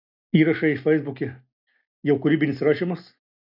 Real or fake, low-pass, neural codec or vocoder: real; 5.4 kHz; none